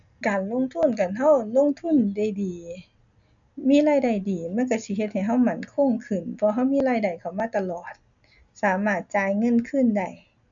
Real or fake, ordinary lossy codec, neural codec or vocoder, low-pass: real; none; none; 7.2 kHz